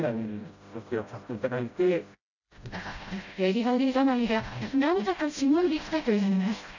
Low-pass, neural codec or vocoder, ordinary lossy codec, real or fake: 7.2 kHz; codec, 16 kHz, 0.5 kbps, FreqCodec, smaller model; none; fake